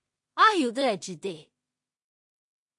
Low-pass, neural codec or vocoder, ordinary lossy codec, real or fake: 10.8 kHz; codec, 16 kHz in and 24 kHz out, 0.4 kbps, LongCat-Audio-Codec, two codebook decoder; MP3, 48 kbps; fake